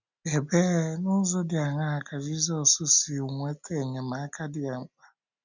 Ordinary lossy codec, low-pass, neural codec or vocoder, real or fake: none; 7.2 kHz; none; real